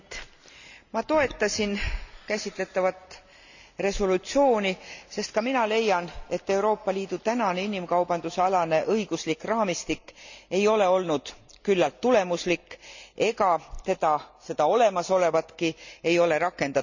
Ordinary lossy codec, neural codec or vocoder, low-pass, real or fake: none; none; 7.2 kHz; real